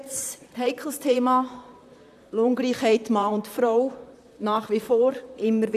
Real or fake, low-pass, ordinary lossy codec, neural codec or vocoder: fake; 14.4 kHz; none; vocoder, 44.1 kHz, 128 mel bands, Pupu-Vocoder